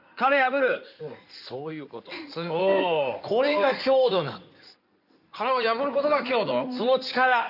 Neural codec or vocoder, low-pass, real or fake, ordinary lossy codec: codec, 16 kHz in and 24 kHz out, 2.2 kbps, FireRedTTS-2 codec; 5.4 kHz; fake; none